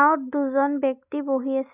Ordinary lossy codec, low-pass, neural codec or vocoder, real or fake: none; 3.6 kHz; none; real